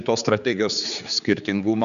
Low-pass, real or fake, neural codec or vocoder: 7.2 kHz; fake; codec, 16 kHz, 4 kbps, X-Codec, HuBERT features, trained on general audio